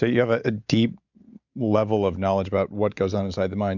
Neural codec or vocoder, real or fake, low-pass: none; real; 7.2 kHz